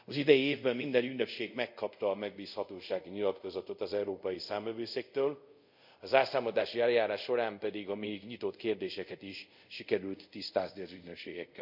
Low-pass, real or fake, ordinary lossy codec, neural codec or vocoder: 5.4 kHz; fake; none; codec, 24 kHz, 0.5 kbps, DualCodec